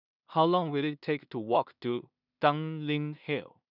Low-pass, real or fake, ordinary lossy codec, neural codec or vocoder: 5.4 kHz; fake; none; codec, 16 kHz in and 24 kHz out, 0.4 kbps, LongCat-Audio-Codec, two codebook decoder